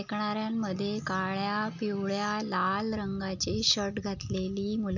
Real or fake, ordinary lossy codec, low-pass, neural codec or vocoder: real; none; 7.2 kHz; none